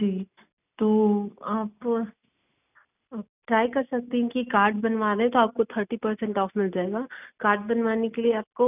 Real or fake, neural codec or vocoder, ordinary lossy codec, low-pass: real; none; none; 3.6 kHz